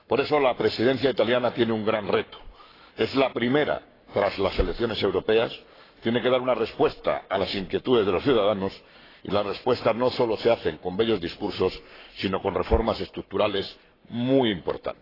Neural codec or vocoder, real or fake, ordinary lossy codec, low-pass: codec, 44.1 kHz, 7.8 kbps, Pupu-Codec; fake; AAC, 24 kbps; 5.4 kHz